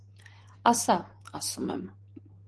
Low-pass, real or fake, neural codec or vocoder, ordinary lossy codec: 10.8 kHz; real; none; Opus, 16 kbps